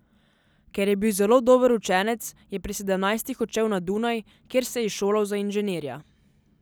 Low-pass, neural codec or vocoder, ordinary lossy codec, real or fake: none; none; none; real